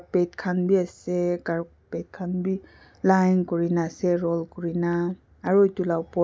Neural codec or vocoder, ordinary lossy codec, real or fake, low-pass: none; none; real; none